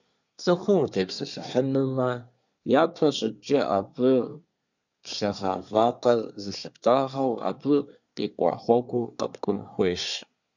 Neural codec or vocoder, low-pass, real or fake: codec, 24 kHz, 1 kbps, SNAC; 7.2 kHz; fake